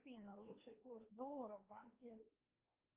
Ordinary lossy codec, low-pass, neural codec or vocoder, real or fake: Opus, 32 kbps; 3.6 kHz; codec, 16 kHz, 2 kbps, X-Codec, WavLM features, trained on Multilingual LibriSpeech; fake